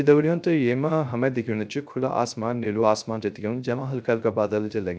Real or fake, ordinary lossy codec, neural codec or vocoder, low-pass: fake; none; codec, 16 kHz, 0.3 kbps, FocalCodec; none